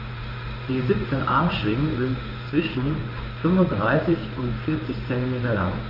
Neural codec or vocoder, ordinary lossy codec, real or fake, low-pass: codec, 16 kHz in and 24 kHz out, 1 kbps, XY-Tokenizer; Opus, 32 kbps; fake; 5.4 kHz